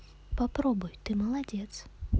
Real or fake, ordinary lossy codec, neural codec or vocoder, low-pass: real; none; none; none